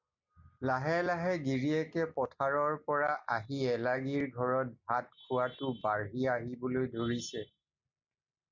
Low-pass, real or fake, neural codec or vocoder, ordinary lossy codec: 7.2 kHz; real; none; MP3, 64 kbps